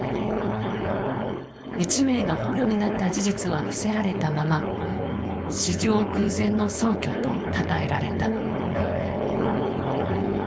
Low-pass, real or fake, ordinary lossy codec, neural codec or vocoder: none; fake; none; codec, 16 kHz, 4.8 kbps, FACodec